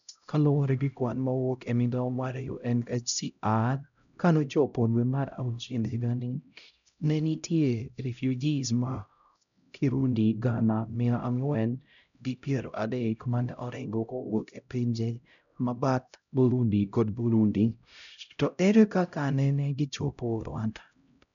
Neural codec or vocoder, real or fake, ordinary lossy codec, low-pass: codec, 16 kHz, 0.5 kbps, X-Codec, HuBERT features, trained on LibriSpeech; fake; none; 7.2 kHz